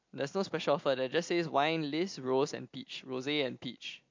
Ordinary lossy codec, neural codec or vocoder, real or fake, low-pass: MP3, 48 kbps; none; real; 7.2 kHz